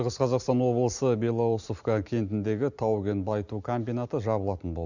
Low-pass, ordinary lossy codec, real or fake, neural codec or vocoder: 7.2 kHz; none; real; none